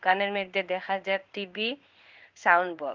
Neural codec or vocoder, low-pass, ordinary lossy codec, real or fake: codec, 16 kHz in and 24 kHz out, 1 kbps, XY-Tokenizer; 7.2 kHz; Opus, 24 kbps; fake